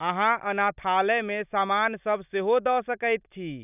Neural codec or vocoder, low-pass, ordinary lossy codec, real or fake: none; 3.6 kHz; none; real